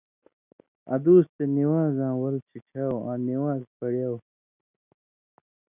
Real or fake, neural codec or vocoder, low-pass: real; none; 3.6 kHz